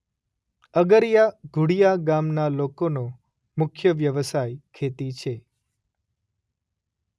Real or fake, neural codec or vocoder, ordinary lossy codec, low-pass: real; none; none; none